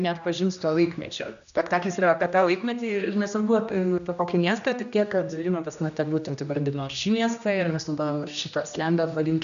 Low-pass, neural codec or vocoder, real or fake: 7.2 kHz; codec, 16 kHz, 1 kbps, X-Codec, HuBERT features, trained on general audio; fake